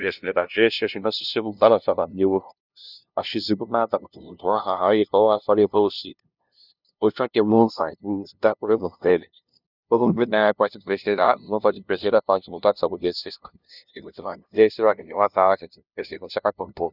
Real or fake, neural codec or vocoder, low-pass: fake; codec, 16 kHz, 0.5 kbps, FunCodec, trained on LibriTTS, 25 frames a second; 5.4 kHz